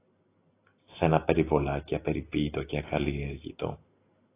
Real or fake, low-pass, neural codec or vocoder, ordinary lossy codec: real; 3.6 kHz; none; AAC, 24 kbps